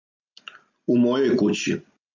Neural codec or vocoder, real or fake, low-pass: none; real; 7.2 kHz